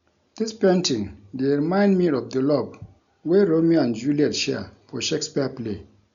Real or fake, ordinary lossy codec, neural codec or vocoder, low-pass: real; none; none; 7.2 kHz